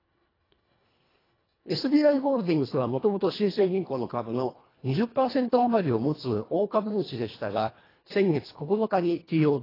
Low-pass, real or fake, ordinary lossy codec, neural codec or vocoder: 5.4 kHz; fake; AAC, 24 kbps; codec, 24 kHz, 1.5 kbps, HILCodec